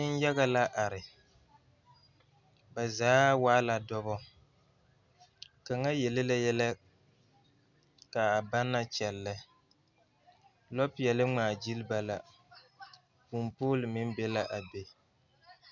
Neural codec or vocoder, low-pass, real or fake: none; 7.2 kHz; real